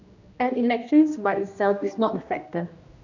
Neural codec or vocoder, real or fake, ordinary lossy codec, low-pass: codec, 16 kHz, 1 kbps, X-Codec, HuBERT features, trained on general audio; fake; none; 7.2 kHz